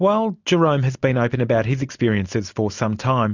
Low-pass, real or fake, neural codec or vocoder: 7.2 kHz; real; none